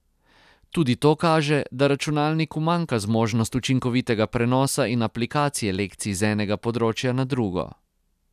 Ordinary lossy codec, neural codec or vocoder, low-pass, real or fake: none; none; 14.4 kHz; real